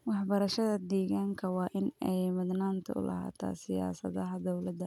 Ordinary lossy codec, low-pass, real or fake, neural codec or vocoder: none; 19.8 kHz; real; none